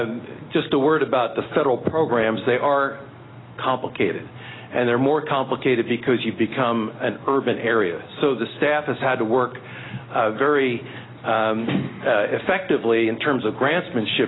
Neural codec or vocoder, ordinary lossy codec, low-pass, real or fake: none; AAC, 16 kbps; 7.2 kHz; real